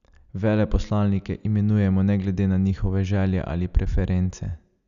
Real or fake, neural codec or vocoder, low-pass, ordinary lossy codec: real; none; 7.2 kHz; none